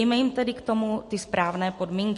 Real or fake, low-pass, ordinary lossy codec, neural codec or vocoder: real; 14.4 kHz; MP3, 48 kbps; none